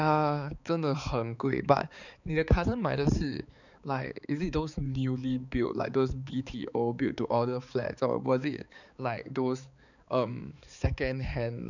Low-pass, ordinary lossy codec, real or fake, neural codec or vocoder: 7.2 kHz; none; fake; codec, 16 kHz, 4 kbps, X-Codec, HuBERT features, trained on balanced general audio